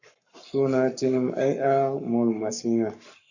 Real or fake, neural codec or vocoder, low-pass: fake; codec, 44.1 kHz, 7.8 kbps, Pupu-Codec; 7.2 kHz